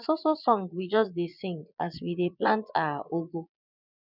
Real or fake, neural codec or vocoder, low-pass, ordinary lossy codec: fake; vocoder, 22.05 kHz, 80 mel bands, Vocos; 5.4 kHz; none